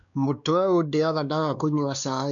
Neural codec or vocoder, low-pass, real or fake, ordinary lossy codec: codec, 16 kHz, 2 kbps, X-Codec, WavLM features, trained on Multilingual LibriSpeech; 7.2 kHz; fake; none